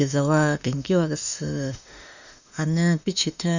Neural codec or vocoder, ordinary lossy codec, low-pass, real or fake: autoencoder, 48 kHz, 32 numbers a frame, DAC-VAE, trained on Japanese speech; none; 7.2 kHz; fake